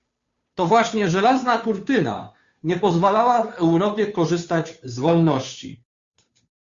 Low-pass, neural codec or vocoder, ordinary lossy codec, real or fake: 7.2 kHz; codec, 16 kHz, 2 kbps, FunCodec, trained on Chinese and English, 25 frames a second; Opus, 64 kbps; fake